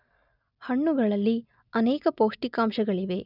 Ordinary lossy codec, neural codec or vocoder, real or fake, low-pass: none; none; real; 5.4 kHz